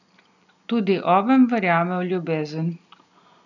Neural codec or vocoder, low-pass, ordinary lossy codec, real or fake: none; 7.2 kHz; none; real